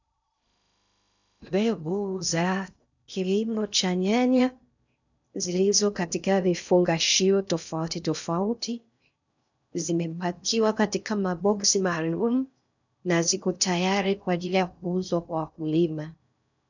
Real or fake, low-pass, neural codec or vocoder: fake; 7.2 kHz; codec, 16 kHz in and 24 kHz out, 0.6 kbps, FocalCodec, streaming, 2048 codes